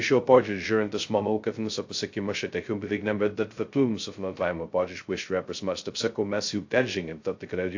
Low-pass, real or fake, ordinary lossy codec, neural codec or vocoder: 7.2 kHz; fake; AAC, 48 kbps; codec, 16 kHz, 0.2 kbps, FocalCodec